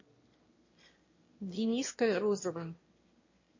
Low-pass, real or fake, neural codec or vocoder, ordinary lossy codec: 7.2 kHz; fake; autoencoder, 22.05 kHz, a latent of 192 numbers a frame, VITS, trained on one speaker; MP3, 32 kbps